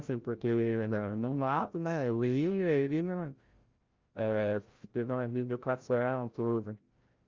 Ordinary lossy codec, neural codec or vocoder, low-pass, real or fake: Opus, 32 kbps; codec, 16 kHz, 0.5 kbps, FreqCodec, larger model; 7.2 kHz; fake